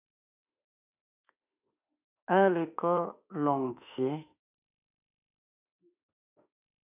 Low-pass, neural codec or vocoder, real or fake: 3.6 kHz; autoencoder, 48 kHz, 32 numbers a frame, DAC-VAE, trained on Japanese speech; fake